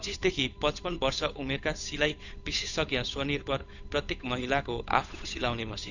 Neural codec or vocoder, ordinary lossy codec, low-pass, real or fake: codec, 16 kHz, 8 kbps, FunCodec, trained on Chinese and English, 25 frames a second; none; 7.2 kHz; fake